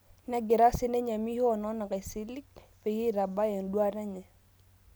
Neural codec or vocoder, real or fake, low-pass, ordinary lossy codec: none; real; none; none